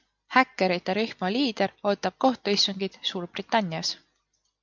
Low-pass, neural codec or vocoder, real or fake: 7.2 kHz; none; real